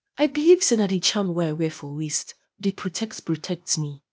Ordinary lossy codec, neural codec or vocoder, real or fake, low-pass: none; codec, 16 kHz, 0.8 kbps, ZipCodec; fake; none